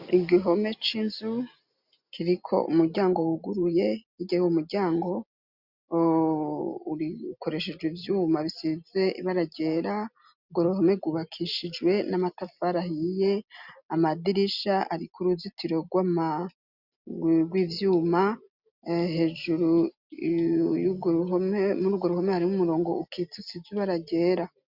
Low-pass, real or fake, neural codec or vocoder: 5.4 kHz; real; none